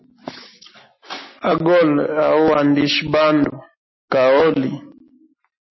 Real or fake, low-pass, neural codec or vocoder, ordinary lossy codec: real; 7.2 kHz; none; MP3, 24 kbps